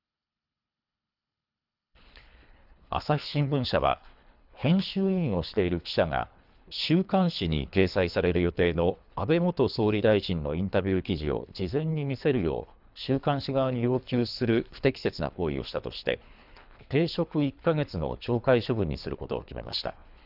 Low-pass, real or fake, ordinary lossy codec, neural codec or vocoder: 5.4 kHz; fake; none; codec, 24 kHz, 3 kbps, HILCodec